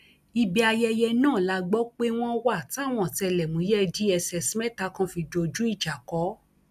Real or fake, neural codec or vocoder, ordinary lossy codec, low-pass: real; none; none; 14.4 kHz